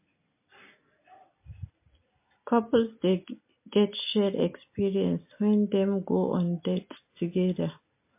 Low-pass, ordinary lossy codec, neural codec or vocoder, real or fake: 3.6 kHz; MP3, 24 kbps; none; real